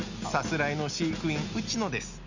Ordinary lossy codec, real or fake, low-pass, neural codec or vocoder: none; real; 7.2 kHz; none